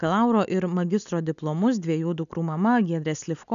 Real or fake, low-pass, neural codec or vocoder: real; 7.2 kHz; none